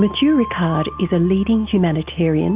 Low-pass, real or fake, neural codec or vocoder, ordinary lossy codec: 3.6 kHz; real; none; Opus, 24 kbps